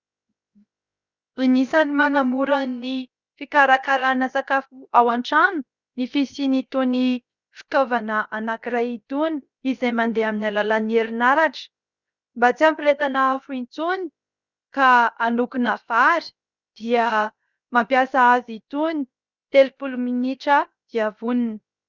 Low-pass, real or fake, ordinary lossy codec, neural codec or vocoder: 7.2 kHz; fake; Opus, 64 kbps; codec, 16 kHz, 0.7 kbps, FocalCodec